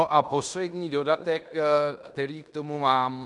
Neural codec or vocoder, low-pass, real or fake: codec, 16 kHz in and 24 kHz out, 0.9 kbps, LongCat-Audio-Codec, fine tuned four codebook decoder; 10.8 kHz; fake